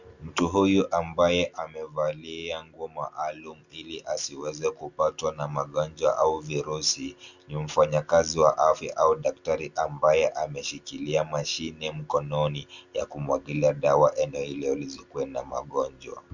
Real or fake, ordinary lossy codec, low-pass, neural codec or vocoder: real; Opus, 64 kbps; 7.2 kHz; none